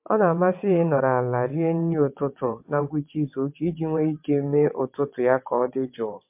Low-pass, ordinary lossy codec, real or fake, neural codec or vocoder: 3.6 kHz; MP3, 32 kbps; fake; vocoder, 22.05 kHz, 80 mel bands, WaveNeXt